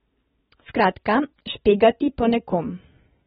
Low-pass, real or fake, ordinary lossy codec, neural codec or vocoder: 19.8 kHz; real; AAC, 16 kbps; none